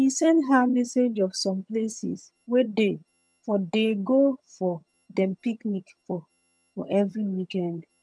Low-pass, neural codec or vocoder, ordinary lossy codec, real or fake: none; vocoder, 22.05 kHz, 80 mel bands, HiFi-GAN; none; fake